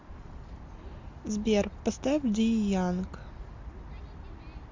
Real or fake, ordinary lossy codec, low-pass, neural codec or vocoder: real; MP3, 64 kbps; 7.2 kHz; none